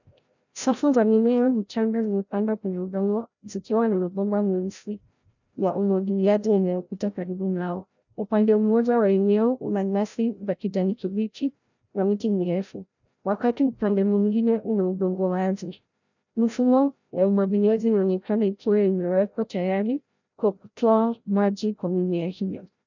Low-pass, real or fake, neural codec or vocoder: 7.2 kHz; fake; codec, 16 kHz, 0.5 kbps, FreqCodec, larger model